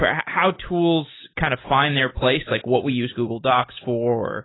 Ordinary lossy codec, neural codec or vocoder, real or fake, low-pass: AAC, 16 kbps; none; real; 7.2 kHz